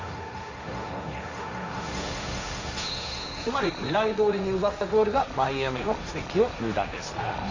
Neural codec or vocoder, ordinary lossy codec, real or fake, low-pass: codec, 16 kHz, 1.1 kbps, Voila-Tokenizer; AAC, 48 kbps; fake; 7.2 kHz